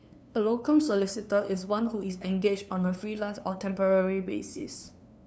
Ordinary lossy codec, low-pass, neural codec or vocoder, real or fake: none; none; codec, 16 kHz, 2 kbps, FunCodec, trained on LibriTTS, 25 frames a second; fake